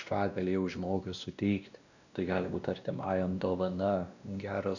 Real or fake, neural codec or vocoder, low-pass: fake; codec, 16 kHz, 1 kbps, X-Codec, WavLM features, trained on Multilingual LibriSpeech; 7.2 kHz